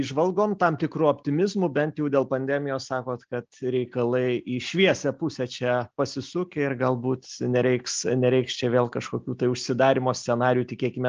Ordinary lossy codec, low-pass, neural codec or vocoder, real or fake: Opus, 24 kbps; 7.2 kHz; none; real